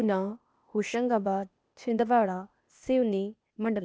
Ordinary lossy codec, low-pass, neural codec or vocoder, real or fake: none; none; codec, 16 kHz, 0.8 kbps, ZipCodec; fake